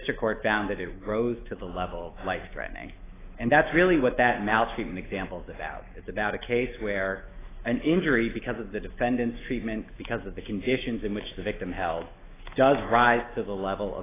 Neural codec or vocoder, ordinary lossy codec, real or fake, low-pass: autoencoder, 48 kHz, 128 numbers a frame, DAC-VAE, trained on Japanese speech; AAC, 16 kbps; fake; 3.6 kHz